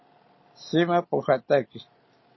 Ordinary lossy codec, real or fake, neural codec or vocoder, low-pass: MP3, 24 kbps; real; none; 7.2 kHz